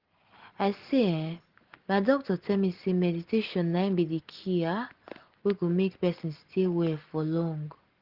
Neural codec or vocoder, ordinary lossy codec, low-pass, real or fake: none; Opus, 16 kbps; 5.4 kHz; real